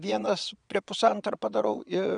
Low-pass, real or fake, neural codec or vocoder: 9.9 kHz; fake; vocoder, 22.05 kHz, 80 mel bands, Vocos